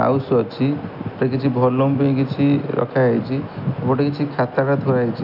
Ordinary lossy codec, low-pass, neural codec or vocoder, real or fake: none; 5.4 kHz; none; real